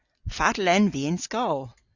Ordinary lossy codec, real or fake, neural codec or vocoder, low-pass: Opus, 64 kbps; real; none; 7.2 kHz